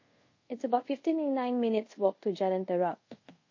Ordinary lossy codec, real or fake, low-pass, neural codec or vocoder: MP3, 32 kbps; fake; 7.2 kHz; codec, 24 kHz, 0.5 kbps, DualCodec